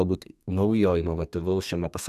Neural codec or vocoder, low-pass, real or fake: codec, 32 kHz, 1.9 kbps, SNAC; 14.4 kHz; fake